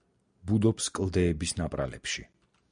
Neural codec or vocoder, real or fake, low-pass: none; real; 9.9 kHz